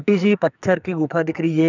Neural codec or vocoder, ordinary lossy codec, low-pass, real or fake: codec, 44.1 kHz, 2.6 kbps, SNAC; none; 7.2 kHz; fake